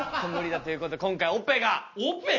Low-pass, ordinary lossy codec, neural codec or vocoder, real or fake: 7.2 kHz; MP3, 32 kbps; none; real